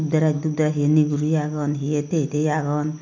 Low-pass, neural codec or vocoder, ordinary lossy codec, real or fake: 7.2 kHz; none; MP3, 64 kbps; real